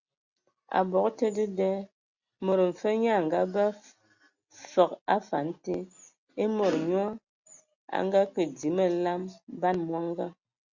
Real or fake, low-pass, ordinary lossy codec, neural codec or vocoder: real; 7.2 kHz; Opus, 64 kbps; none